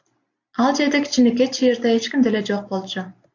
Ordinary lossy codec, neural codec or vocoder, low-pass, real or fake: AAC, 48 kbps; none; 7.2 kHz; real